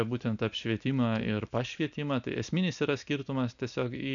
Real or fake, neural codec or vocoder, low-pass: real; none; 7.2 kHz